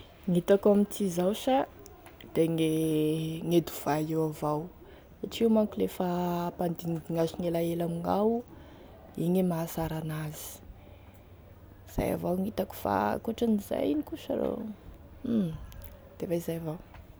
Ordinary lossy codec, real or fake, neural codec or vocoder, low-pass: none; real; none; none